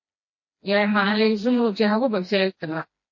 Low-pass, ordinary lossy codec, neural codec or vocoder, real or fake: 7.2 kHz; MP3, 32 kbps; codec, 16 kHz, 1 kbps, FreqCodec, smaller model; fake